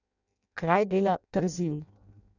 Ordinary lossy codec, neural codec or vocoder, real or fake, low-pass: none; codec, 16 kHz in and 24 kHz out, 0.6 kbps, FireRedTTS-2 codec; fake; 7.2 kHz